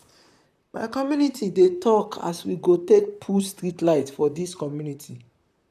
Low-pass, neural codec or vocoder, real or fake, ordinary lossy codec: 14.4 kHz; vocoder, 44.1 kHz, 128 mel bands, Pupu-Vocoder; fake; none